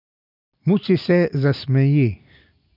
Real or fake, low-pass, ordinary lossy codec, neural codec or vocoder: real; 5.4 kHz; none; none